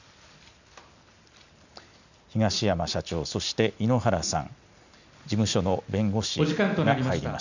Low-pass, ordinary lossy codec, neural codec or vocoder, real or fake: 7.2 kHz; none; none; real